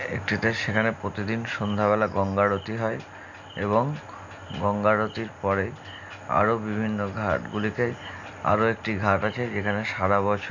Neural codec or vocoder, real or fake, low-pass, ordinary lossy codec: none; real; 7.2 kHz; MP3, 64 kbps